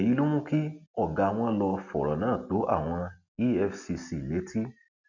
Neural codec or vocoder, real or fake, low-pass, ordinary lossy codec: none; real; 7.2 kHz; none